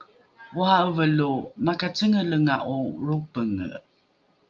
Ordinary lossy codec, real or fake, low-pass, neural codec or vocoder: Opus, 24 kbps; real; 7.2 kHz; none